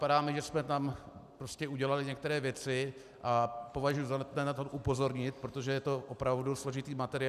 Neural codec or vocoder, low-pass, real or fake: none; 14.4 kHz; real